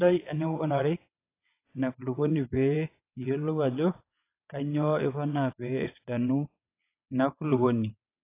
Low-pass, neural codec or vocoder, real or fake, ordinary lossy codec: 3.6 kHz; vocoder, 22.05 kHz, 80 mel bands, WaveNeXt; fake; AAC, 24 kbps